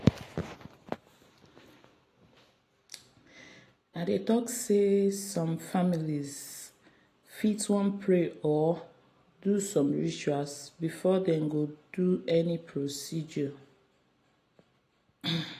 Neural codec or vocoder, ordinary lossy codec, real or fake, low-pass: none; AAC, 48 kbps; real; 14.4 kHz